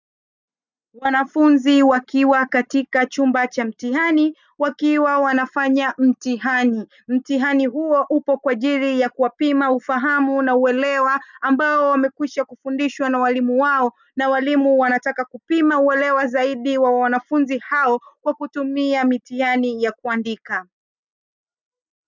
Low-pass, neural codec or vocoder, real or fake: 7.2 kHz; none; real